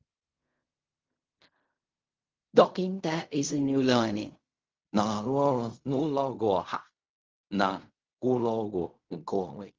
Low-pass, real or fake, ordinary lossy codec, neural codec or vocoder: 7.2 kHz; fake; Opus, 32 kbps; codec, 16 kHz in and 24 kHz out, 0.4 kbps, LongCat-Audio-Codec, fine tuned four codebook decoder